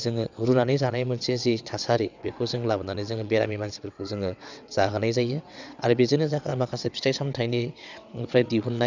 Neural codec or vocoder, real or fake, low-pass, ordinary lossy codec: vocoder, 22.05 kHz, 80 mel bands, WaveNeXt; fake; 7.2 kHz; none